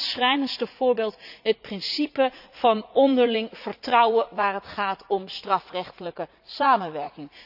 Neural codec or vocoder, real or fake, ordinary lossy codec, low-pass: vocoder, 44.1 kHz, 80 mel bands, Vocos; fake; none; 5.4 kHz